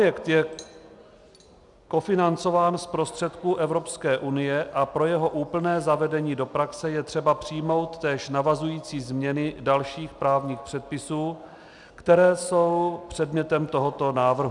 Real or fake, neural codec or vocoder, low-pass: real; none; 10.8 kHz